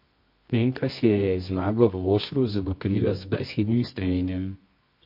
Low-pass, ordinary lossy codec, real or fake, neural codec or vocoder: 5.4 kHz; MP3, 32 kbps; fake; codec, 24 kHz, 0.9 kbps, WavTokenizer, medium music audio release